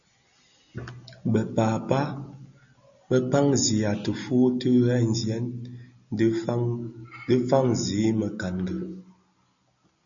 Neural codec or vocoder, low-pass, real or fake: none; 7.2 kHz; real